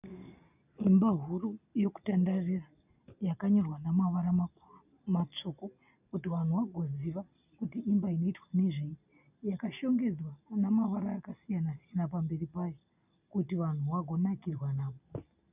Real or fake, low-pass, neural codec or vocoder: real; 3.6 kHz; none